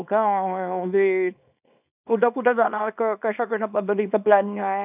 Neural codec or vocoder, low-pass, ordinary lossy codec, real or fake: codec, 24 kHz, 0.9 kbps, WavTokenizer, small release; 3.6 kHz; none; fake